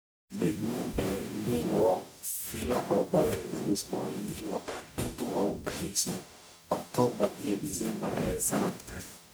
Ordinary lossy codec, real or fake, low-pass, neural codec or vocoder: none; fake; none; codec, 44.1 kHz, 0.9 kbps, DAC